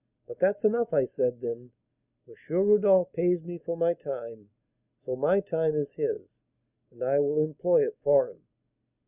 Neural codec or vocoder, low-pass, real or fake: none; 3.6 kHz; real